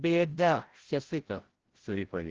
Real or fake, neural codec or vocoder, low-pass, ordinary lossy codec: fake; codec, 16 kHz, 0.5 kbps, FreqCodec, larger model; 7.2 kHz; Opus, 16 kbps